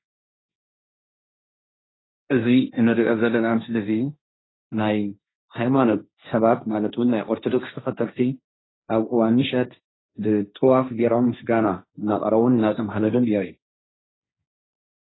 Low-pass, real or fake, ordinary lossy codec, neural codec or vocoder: 7.2 kHz; fake; AAC, 16 kbps; codec, 16 kHz, 1.1 kbps, Voila-Tokenizer